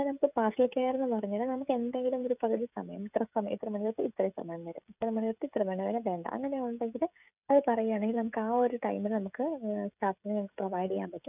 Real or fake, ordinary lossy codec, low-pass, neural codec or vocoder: fake; none; 3.6 kHz; codec, 16 kHz, 16 kbps, FreqCodec, smaller model